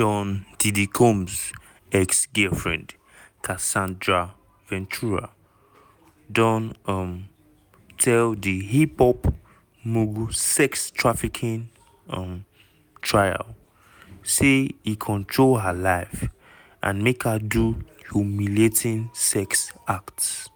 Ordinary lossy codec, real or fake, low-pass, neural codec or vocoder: none; real; none; none